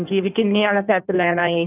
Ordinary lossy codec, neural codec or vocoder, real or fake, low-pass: none; codec, 16 kHz in and 24 kHz out, 1.1 kbps, FireRedTTS-2 codec; fake; 3.6 kHz